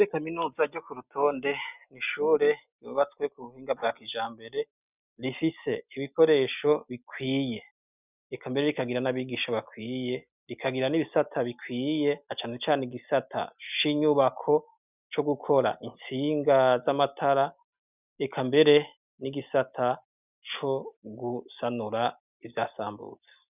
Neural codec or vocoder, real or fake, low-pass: none; real; 3.6 kHz